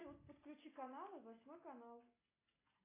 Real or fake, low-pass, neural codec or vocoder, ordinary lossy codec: real; 3.6 kHz; none; AAC, 24 kbps